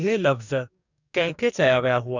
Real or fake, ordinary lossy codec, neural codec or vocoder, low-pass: fake; none; codec, 44.1 kHz, 2.6 kbps, DAC; 7.2 kHz